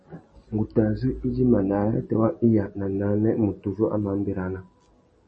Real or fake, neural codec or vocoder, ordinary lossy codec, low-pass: real; none; MP3, 32 kbps; 10.8 kHz